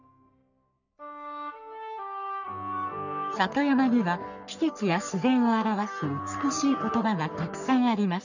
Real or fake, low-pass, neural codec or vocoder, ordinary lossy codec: fake; 7.2 kHz; codec, 44.1 kHz, 3.4 kbps, Pupu-Codec; none